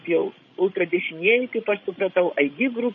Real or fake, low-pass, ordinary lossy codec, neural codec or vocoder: fake; 7.2 kHz; MP3, 32 kbps; codec, 16 kHz, 16 kbps, FreqCodec, larger model